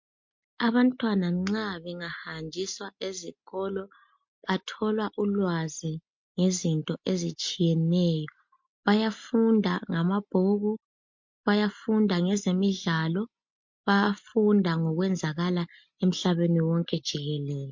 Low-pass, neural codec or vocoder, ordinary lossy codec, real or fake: 7.2 kHz; none; MP3, 48 kbps; real